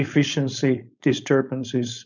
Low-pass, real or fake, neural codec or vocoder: 7.2 kHz; fake; codec, 16 kHz, 4.8 kbps, FACodec